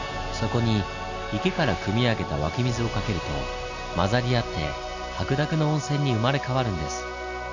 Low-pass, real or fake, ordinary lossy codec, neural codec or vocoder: 7.2 kHz; real; none; none